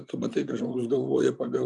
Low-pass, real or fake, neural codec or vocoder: 10.8 kHz; fake; vocoder, 44.1 kHz, 128 mel bands, Pupu-Vocoder